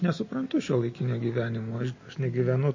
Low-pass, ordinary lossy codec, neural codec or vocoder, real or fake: 7.2 kHz; MP3, 32 kbps; none; real